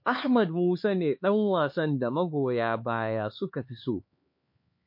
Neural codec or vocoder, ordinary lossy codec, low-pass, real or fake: codec, 16 kHz, 4 kbps, X-Codec, HuBERT features, trained on LibriSpeech; MP3, 32 kbps; 5.4 kHz; fake